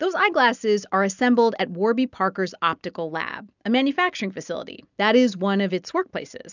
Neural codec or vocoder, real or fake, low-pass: none; real; 7.2 kHz